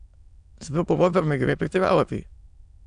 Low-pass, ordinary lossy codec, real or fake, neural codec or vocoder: 9.9 kHz; none; fake; autoencoder, 22.05 kHz, a latent of 192 numbers a frame, VITS, trained on many speakers